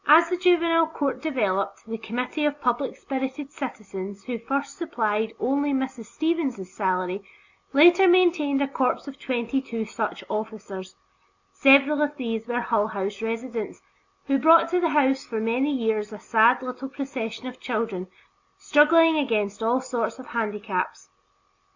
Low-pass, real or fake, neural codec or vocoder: 7.2 kHz; real; none